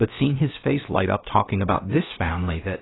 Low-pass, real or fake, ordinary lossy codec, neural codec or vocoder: 7.2 kHz; fake; AAC, 16 kbps; codec, 16 kHz, about 1 kbps, DyCAST, with the encoder's durations